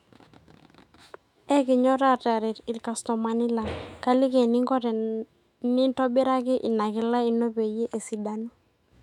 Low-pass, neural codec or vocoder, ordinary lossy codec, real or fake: 19.8 kHz; autoencoder, 48 kHz, 128 numbers a frame, DAC-VAE, trained on Japanese speech; none; fake